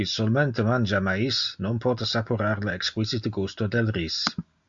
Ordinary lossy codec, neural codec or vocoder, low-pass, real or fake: AAC, 64 kbps; none; 7.2 kHz; real